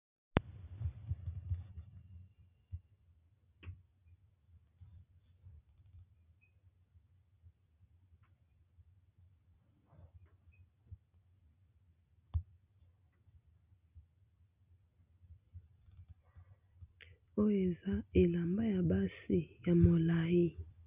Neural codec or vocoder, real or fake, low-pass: none; real; 3.6 kHz